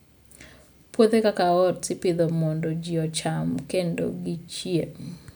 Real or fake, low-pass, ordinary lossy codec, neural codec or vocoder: real; none; none; none